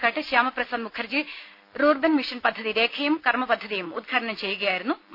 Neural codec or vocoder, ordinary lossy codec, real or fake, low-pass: none; none; real; 5.4 kHz